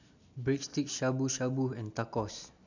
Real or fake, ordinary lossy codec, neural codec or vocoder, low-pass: real; none; none; 7.2 kHz